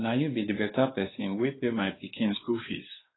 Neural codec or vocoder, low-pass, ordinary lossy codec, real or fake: codec, 16 kHz, 2 kbps, X-Codec, WavLM features, trained on Multilingual LibriSpeech; 7.2 kHz; AAC, 16 kbps; fake